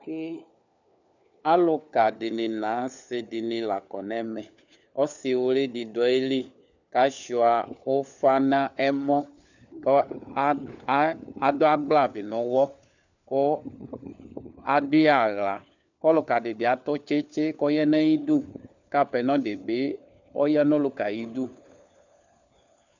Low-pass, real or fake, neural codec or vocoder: 7.2 kHz; fake; codec, 16 kHz, 4 kbps, FunCodec, trained on LibriTTS, 50 frames a second